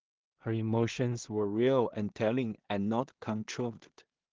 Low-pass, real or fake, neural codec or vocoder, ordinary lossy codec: 7.2 kHz; fake; codec, 16 kHz in and 24 kHz out, 0.4 kbps, LongCat-Audio-Codec, two codebook decoder; Opus, 16 kbps